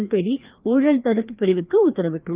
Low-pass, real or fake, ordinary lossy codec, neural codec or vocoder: 3.6 kHz; fake; Opus, 32 kbps; codec, 16 kHz, 2 kbps, FreqCodec, larger model